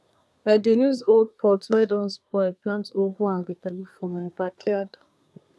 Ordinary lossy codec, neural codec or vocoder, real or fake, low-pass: none; codec, 24 kHz, 1 kbps, SNAC; fake; none